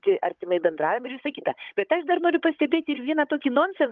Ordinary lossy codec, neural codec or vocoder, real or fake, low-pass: Opus, 24 kbps; codec, 16 kHz, 16 kbps, FunCodec, trained on Chinese and English, 50 frames a second; fake; 7.2 kHz